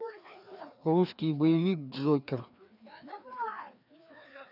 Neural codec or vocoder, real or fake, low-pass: codec, 16 kHz, 2 kbps, FreqCodec, larger model; fake; 5.4 kHz